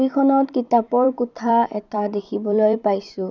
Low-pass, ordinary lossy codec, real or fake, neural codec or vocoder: 7.2 kHz; none; fake; vocoder, 44.1 kHz, 128 mel bands every 256 samples, BigVGAN v2